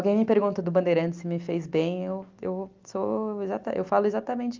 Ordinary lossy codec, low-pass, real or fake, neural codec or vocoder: Opus, 32 kbps; 7.2 kHz; real; none